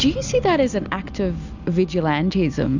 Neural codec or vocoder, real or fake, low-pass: none; real; 7.2 kHz